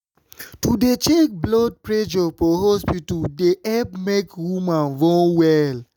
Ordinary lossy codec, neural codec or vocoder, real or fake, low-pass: none; none; real; none